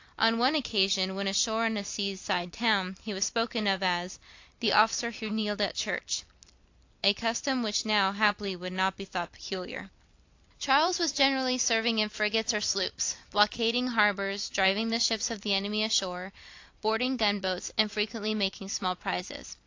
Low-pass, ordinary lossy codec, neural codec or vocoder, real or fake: 7.2 kHz; AAC, 48 kbps; vocoder, 44.1 kHz, 128 mel bands every 256 samples, BigVGAN v2; fake